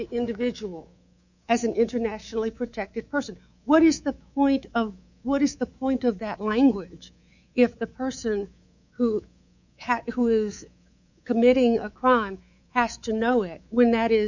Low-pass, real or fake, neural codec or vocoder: 7.2 kHz; fake; codec, 24 kHz, 3.1 kbps, DualCodec